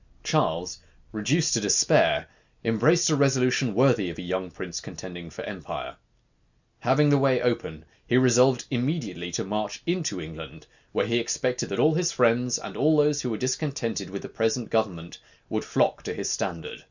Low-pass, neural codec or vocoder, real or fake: 7.2 kHz; none; real